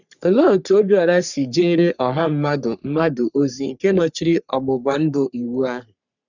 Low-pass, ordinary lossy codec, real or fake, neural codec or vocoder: 7.2 kHz; none; fake; codec, 44.1 kHz, 3.4 kbps, Pupu-Codec